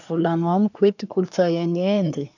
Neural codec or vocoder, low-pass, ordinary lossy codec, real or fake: codec, 24 kHz, 1 kbps, SNAC; 7.2 kHz; none; fake